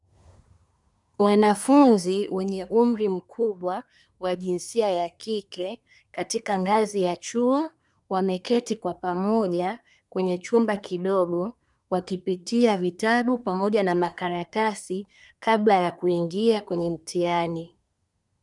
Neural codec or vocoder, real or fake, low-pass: codec, 24 kHz, 1 kbps, SNAC; fake; 10.8 kHz